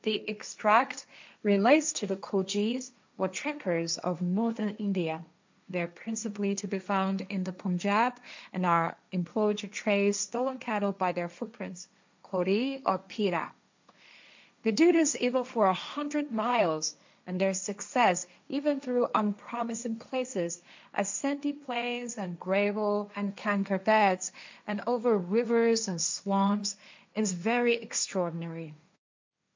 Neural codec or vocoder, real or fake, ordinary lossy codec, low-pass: codec, 16 kHz, 1.1 kbps, Voila-Tokenizer; fake; MP3, 64 kbps; 7.2 kHz